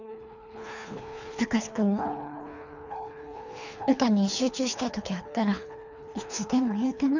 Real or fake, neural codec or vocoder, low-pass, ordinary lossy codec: fake; codec, 24 kHz, 3 kbps, HILCodec; 7.2 kHz; none